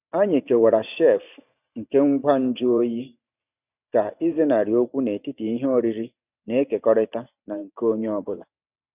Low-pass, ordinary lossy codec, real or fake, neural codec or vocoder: 3.6 kHz; none; fake; vocoder, 44.1 kHz, 128 mel bands every 512 samples, BigVGAN v2